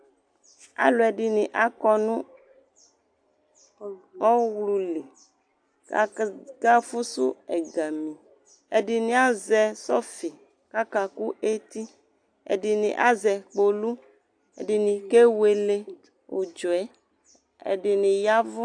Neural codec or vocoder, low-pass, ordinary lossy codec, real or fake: none; 9.9 kHz; AAC, 64 kbps; real